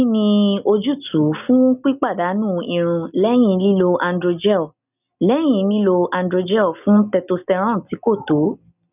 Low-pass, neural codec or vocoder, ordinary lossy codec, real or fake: 3.6 kHz; none; none; real